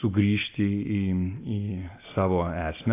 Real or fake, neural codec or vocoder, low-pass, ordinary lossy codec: real; none; 3.6 kHz; AAC, 24 kbps